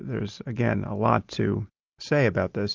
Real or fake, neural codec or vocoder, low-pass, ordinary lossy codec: real; none; 7.2 kHz; Opus, 24 kbps